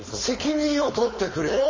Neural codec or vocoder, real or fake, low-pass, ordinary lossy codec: codec, 16 kHz, 4.8 kbps, FACodec; fake; 7.2 kHz; MP3, 32 kbps